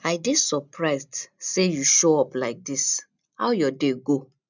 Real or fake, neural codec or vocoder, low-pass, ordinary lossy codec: real; none; 7.2 kHz; none